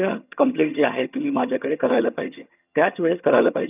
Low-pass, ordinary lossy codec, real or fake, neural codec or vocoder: 3.6 kHz; none; fake; vocoder, 22.05 kHz, 80 mel bands, HiFi-GAN